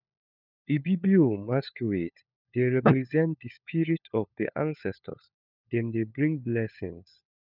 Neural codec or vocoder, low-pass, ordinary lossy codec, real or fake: codec, 16 kHz, 4 kbps, FunCodec, trained on LibriTTS, 50 frames a second; 5.4 kHz; none; fake